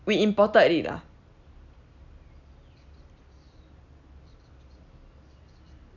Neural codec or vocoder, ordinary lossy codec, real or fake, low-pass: none; none; real; 7.2 kHz